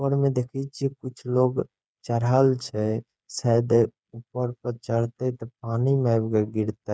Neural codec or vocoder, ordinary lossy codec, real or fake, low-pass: codec, 16 kHz, 8 kbps, FreqCodec, smaller model; none; fake; none